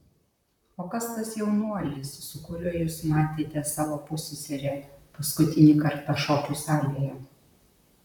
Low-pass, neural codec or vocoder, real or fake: 19.8 kHz; vocoder, 44.1 kHz, 128 mel bands, Pupu-Vocoder; fake